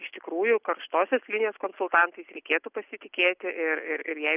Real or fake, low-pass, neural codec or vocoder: real; 3.6 kHz; none